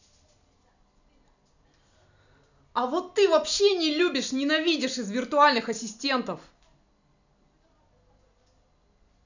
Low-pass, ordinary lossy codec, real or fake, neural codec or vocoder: 7.2 kHz; none; real; none